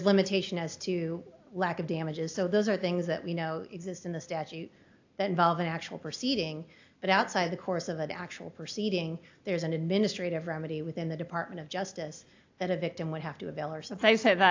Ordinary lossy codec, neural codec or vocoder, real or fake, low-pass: AAC, 48 kbps; none; real; 7.2 kHz